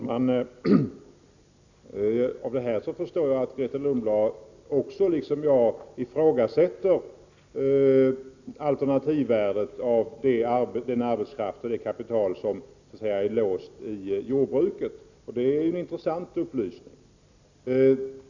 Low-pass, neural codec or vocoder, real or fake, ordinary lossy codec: 7.2 kHz; none; real; none